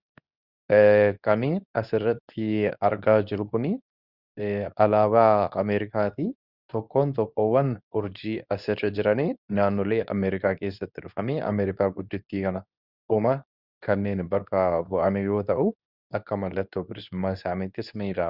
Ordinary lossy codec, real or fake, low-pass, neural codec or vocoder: Opus, 64 kbps; fake; 5.4 kHz; codec, 24 kHz, 0.9 kbps, WavTokenizer, medium speech release version 2